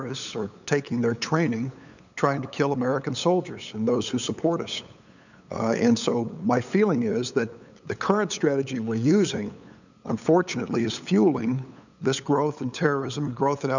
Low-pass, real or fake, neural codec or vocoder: 7.2 kHz; fake; codec, 16 kHz, 8 kbps, FunCodec, trained on LibriTTS, 25 frames a second